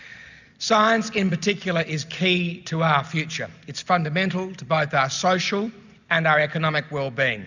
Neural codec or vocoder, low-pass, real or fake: none; 7.2 kHz; real